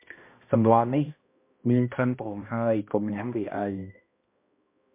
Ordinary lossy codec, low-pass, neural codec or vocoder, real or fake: MP3, 24 kbps; 3.6 kHz; codec, 16 kHz, 1 kbps, X-Codec, HuBERT features, trained on balanced general audio; fake